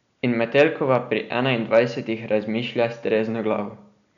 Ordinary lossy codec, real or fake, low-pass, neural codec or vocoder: none; real; 7.2 kHz; none